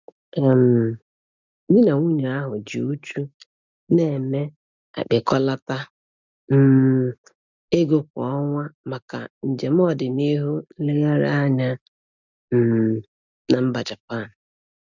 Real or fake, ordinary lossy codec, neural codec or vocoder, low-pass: real; none; none; 7.2 kHz